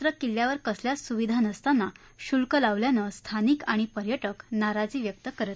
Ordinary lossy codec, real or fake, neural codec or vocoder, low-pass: none; real; none; none